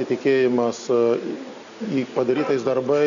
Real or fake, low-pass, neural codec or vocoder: real; 7.2 kHz; none